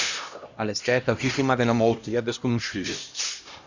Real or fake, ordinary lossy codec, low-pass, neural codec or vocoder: fake; Opus, 64 kbps; 7.2 kHz; codec, 16 kHz, 1 kbps, X-Codec, HuBERT features, trained on LibriSpeech